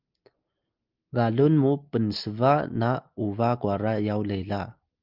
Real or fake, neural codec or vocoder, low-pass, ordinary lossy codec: real; none; 5.4 kHz; Opus, 24 kbps